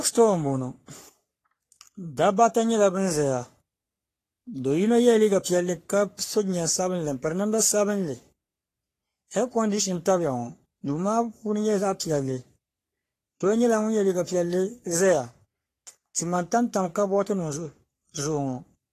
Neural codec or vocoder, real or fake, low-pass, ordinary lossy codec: codec, 44.1 kHz, 3.4 kbps, Pupu-Codec; fake; 14.4 kHz; AAC, 48 kbps